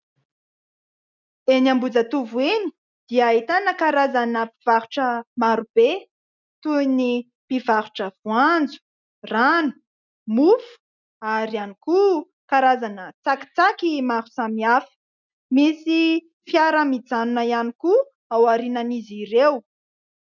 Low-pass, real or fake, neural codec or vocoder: 7.2 kHz; real; none